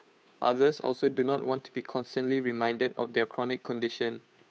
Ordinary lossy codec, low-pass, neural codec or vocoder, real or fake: none; none; codec, 16 kHz, 2 kbps, FunCodec, trained on Chinese and English, 25 frames a second; fake